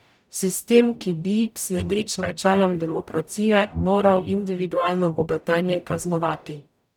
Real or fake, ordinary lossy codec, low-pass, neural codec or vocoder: fake; none; 19.8 kHz; codec, 44.1 kHz, 0.9 kbps, DAC